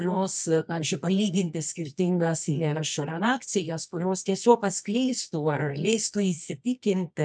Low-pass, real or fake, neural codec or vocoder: 9.9 kHz; fake; codec, 24 kHz, 0.9 kbps, WavTokenizer, medium music audio release